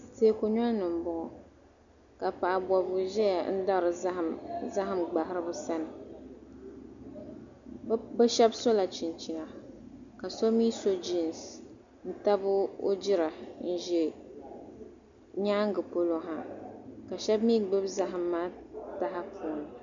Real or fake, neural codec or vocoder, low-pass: real; none; 7.2 kHz